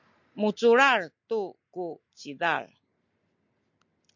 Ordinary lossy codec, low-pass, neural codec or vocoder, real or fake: MP3, 48 kbps; 7.2 kHz; none; real